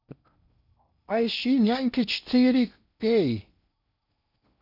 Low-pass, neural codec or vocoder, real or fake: 5.4 kHz; codec, 16 kHz in and 24 kHz out, 0.6 kbps, FocalCodec, streaming, 2048 codes; fake